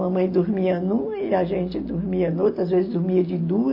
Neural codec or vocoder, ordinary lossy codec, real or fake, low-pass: none; MP3, 24 kbps; real; 5.4 kHz